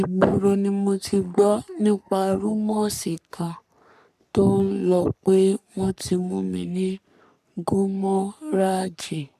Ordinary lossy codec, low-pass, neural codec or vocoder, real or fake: none; 14.4 kHz; codec, 44.1 kHz, 3.4 kbps, Pupu-Codec; fake